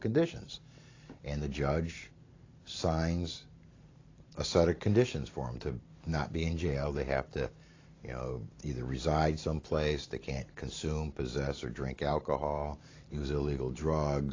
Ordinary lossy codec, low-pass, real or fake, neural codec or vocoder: AAC, 32 kbps; 7.2 kHz; fake; vocoder, 44.1 kHz, 128 mel bands every 512 samples, BigVGAN v2